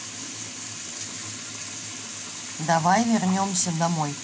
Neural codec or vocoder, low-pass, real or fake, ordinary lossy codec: none; none; real; none